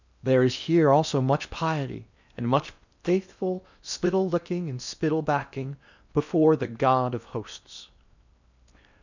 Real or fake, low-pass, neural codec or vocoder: fake; 7.2 kHz; codec, 16 kHz in and 24 kHz out, 0.8 kbps, FocalCodec, streaming, 65536 codes